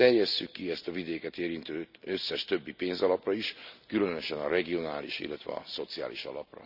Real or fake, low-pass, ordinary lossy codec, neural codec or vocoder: real; 5.4 kHz; none; none